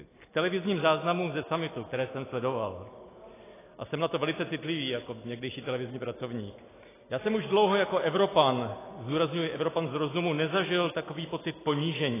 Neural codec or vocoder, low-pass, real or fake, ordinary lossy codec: none; 3.6 kHz; real; AAC, 16 kbps